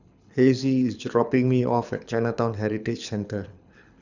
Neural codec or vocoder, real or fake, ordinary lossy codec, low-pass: codec, 24 kHz, 6 kbps, HILCodec; fake; none; 7.2 kHz